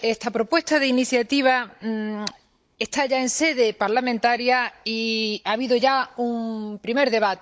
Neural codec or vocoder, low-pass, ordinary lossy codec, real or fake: codec, 16 kHz, 16 kbps, FunCodec, trained on Chinese and English, 50 frames a second; none; none; fake